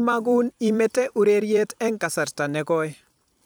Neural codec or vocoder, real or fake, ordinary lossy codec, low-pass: vocoder, 44.1 kHz, 128 mel bands every 256 samples, BigVGAN v2; fake; none; none